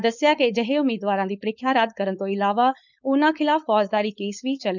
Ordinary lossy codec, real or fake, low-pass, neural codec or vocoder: none; fake; 7.2 kHz; codec, 16 kHz, 4.8 kbps, FACodec